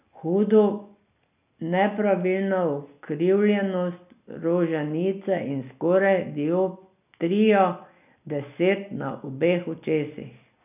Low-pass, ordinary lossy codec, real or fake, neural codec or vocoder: 3.6 kHz; none; real; none